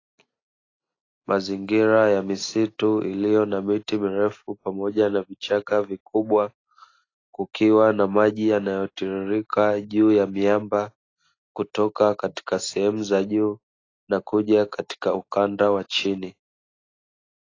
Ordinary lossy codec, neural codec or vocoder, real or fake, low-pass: AAC, 32 kbps; none; real; 7.2 kHz